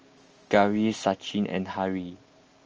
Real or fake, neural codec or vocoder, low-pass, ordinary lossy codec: real; none; 7.2 kHz; Opus, 24 kbps